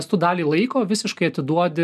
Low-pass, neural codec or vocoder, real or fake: 14.4 kHz; none; real